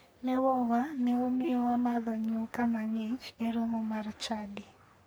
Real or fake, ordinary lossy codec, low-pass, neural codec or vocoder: fake; none; none; codec, 44.1 kHz, 3.4 kbps, Pupu-Codec